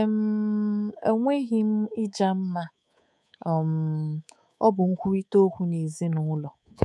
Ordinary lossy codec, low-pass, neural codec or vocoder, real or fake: none; none; codec, 24 kHz, 3.1 kbps, DualCodec; fake